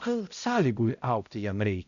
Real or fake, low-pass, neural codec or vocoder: fake; 7.2 kHz; codec, 16 kHz, 0.5 kbps, X-Codec, HuBERT features, trained on balanced general audio